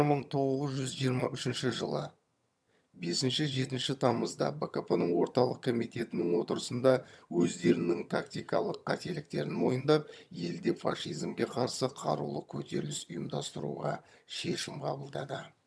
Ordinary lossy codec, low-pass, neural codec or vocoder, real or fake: none; none; vocoder, 22.05 kHz, 80 mel bands, HiFi-GAN; fake